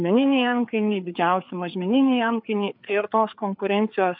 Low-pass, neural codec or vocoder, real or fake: 5.4 kHz; codec, 16 kHz, 4 kbps, FreqCodec, larger model; fake